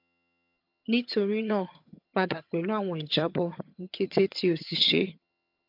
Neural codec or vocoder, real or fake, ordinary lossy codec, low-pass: vocoder, 22.05 kHz, 80 mel bands, HiFi-GAN; fake; MP3, 48 kbps; 5.4 kHz